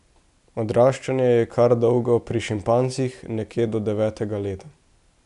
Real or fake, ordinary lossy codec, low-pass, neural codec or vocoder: real; none; 10.8 kHz; none